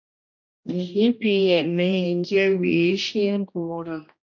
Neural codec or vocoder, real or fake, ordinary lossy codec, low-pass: codec, 16 kHz, 1 kbps, X-Codec, HuBERT features, trained on general audio; fake; MP3, 48 kbps; 7.2 kHz